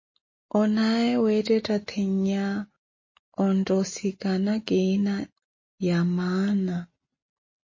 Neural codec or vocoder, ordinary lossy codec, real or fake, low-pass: none; MP3, 32 kbps; real; 7.2 kHz